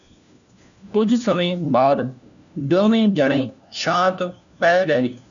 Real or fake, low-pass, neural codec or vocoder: fake; 7.2 kHz; codec, 16 kHz, 1 kbps, FunCodec, trained on LibriTTS, 50 frames a second